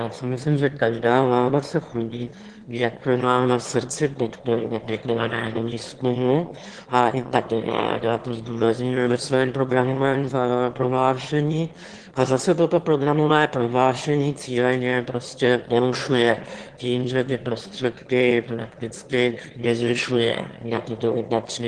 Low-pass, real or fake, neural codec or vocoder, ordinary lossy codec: 9.9 kHz; fake; autoencoder, 22.05 kHz, a latent of 192 numbers a frame, VITS, trained on one speaker; Opus, 16 kbps